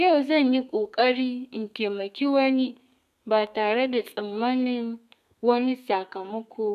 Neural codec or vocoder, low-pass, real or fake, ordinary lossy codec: codec, 32 kHz, 1.9 kbps, SNAC; 14.4 kHz; fake; none